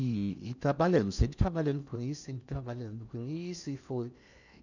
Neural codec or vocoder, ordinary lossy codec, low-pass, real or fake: codec, 16 kHz in and 24 kHz out, 0.8 kbps, FocalCodec, streaming, 65536 codes; none; 7.2 kHz; fake